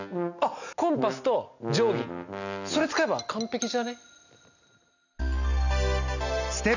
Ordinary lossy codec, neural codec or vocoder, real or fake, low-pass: none; none; real; 7.2 kHz